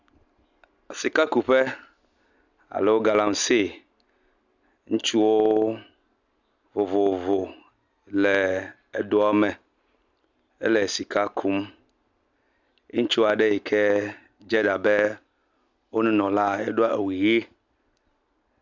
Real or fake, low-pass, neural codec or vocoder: real; 7.2 kHz; none